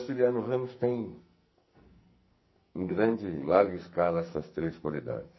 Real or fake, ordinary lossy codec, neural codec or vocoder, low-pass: fake; MP3, 24 kbps; codec, 44.1 kHz, 2.6 kbps, SNAC; 7.2 kHz